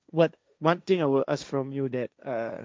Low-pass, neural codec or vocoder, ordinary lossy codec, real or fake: none; codec, 16 kHz, 1.1 kbps, Voila-Tokenizer; none; fake